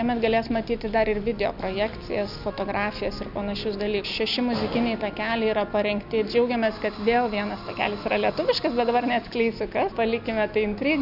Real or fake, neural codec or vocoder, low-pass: real; none; 5.4 kHz